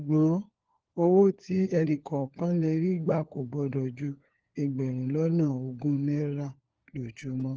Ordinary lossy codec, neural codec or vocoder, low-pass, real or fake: Opus, 16 kbps; codec, 16 kHz, 4 kbps, FunCodec, trained on LibriTTS, 50 frames a second; 7.2 kHz; fake